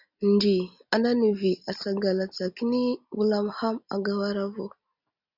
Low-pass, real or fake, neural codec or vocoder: 5.4 kHz; real; none